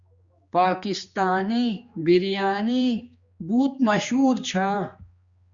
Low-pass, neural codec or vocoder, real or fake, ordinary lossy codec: 7.2 kHz; codec, 16 kHz, 2 kbps, X-Codec, HuBERT features, trained on general audio; fake; Opus, 64 kbps